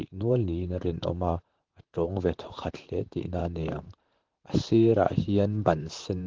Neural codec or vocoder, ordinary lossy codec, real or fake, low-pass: vocoder, 44.1 kHz, 128 mel bands, Pupu-Vocoder; Opus, 16 kbps; fake; 7.2 kHz